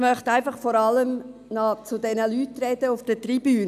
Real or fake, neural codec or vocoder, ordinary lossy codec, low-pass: real; none; none; 14.4 kHz